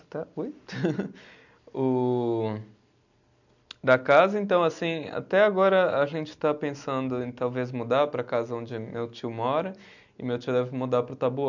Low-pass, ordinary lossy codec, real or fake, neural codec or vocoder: 7.2 kHz; none; real; none